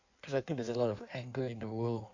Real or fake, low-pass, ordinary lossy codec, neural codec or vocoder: fake; 7.2 kHz; AAC, 48 kbps; codec, 16 kHz in and 24 kHz out, 1.1 kbps, FireRedTTS-2 codec